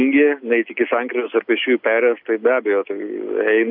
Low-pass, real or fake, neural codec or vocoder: 5.4 kHz; real; none